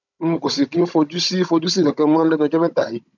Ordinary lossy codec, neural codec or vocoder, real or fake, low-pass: none; codec, 16 kHz, 16 kbps, FunCodec, trained on Chinese and English, 50 frames a second; fake; 7.2 kHz